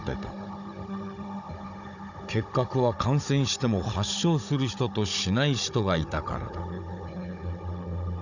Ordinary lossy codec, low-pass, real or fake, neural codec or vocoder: none; 7.2 kHz; fake; codec, 16 kHz, 16 kbps, FunCodec, trained on Chinese and English, 50 frames a second